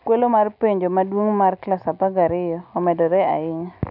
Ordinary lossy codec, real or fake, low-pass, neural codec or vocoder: none; real; 5.4 kHz; none